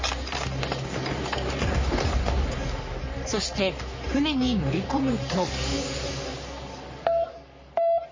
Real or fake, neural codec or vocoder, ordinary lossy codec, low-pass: fake; codec, 44.1 kHz, 3.4 kbps, Pupu-Codec; MP3, 32 kbps; 7.2 kHz